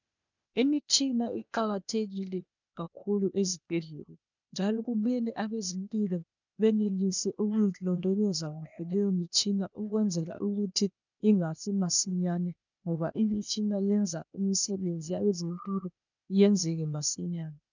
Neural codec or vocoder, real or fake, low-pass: codec, 16 kHz, 0.8 kbps, ZipCodec; fake; 7.2 kHz